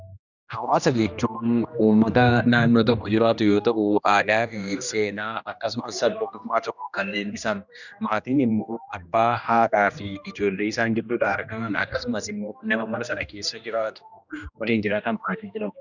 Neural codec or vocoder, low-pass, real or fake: codec, 16 kHz, 1 kbps, X-Codec, HuBERT features, trained on general audio; 7.2 kHz; fake